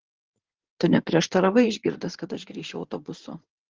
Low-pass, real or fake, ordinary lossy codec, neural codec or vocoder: 7.2 kHz; fake; Opus, 16 kbps; codec, 16 kHz in and 24 kHz out, 2.2 kbps, FireRedTTS-2 codec